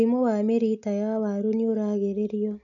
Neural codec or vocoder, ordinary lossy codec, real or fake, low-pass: none; none; real; 7.2 kHz